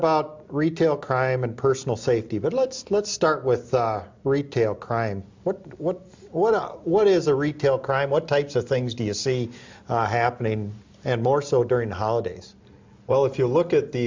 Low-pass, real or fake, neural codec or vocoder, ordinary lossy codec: 7.2 kHz; real; none; MP3, 64 kbps